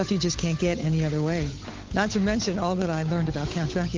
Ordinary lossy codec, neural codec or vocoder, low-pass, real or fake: Opus, 32 kbps; codec, 16 kHz, 8 kbps, FunCodec, trained on Chinese and English, 25 frames a second; 7.2 kHz; fake